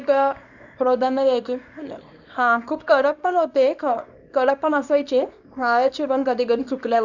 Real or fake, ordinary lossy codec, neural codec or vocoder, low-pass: fake; none; codec, 24 kHz, 0.9 kbps, WavTokenizer, small release; 7.2 kHz